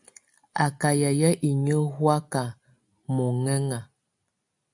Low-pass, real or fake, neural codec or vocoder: 10.8 kHz; real; none